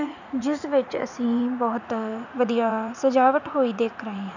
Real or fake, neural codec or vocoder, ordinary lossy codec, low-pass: fake; vocoder, 44.1 kHz, 80 mel bands, Vocos; none; 7.2 kHz